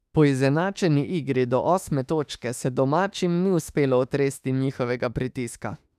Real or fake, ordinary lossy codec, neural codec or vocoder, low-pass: fake; none; autoencoder, 48 kHz, 32 numbers a frame, DAC-VAE, trained on Japanese speech; 14.4 kHz